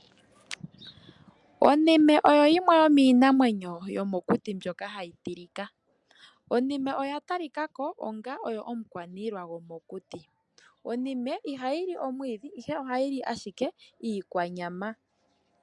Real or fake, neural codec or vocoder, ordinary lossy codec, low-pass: real; none; MP3, 96 kbps; 10.8 kHz